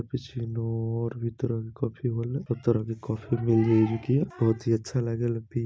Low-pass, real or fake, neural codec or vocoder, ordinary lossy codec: none; real; none; none